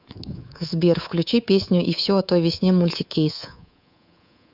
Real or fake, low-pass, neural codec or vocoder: fake; 5.4 kHz; codec, 24 kHz, 3.1 kbps, DualCodec